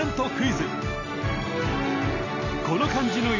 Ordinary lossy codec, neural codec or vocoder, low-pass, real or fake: none; none; 7.2 kHz; real